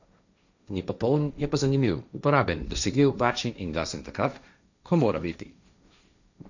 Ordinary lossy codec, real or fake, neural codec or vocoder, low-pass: none; fake; codec, 16 kHz, 1.1 kbps, Voila-Tokenizer; 7.2 kHz